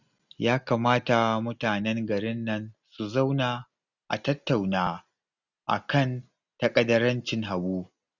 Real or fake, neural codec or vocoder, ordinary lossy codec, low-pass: real; none; none; 7.2 kHz